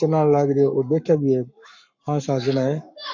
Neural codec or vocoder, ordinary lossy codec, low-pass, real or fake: codec, 44.1 kHz, 7.8 kbps, Pupu-Codec; MP3, 48 kbps; 7.2 kHz; fake